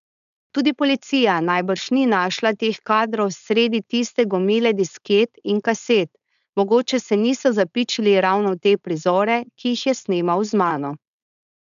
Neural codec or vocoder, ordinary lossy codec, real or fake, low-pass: codec, 16 kHz, 4.8 kbps, FACodec; none; fake; 7.2 kHz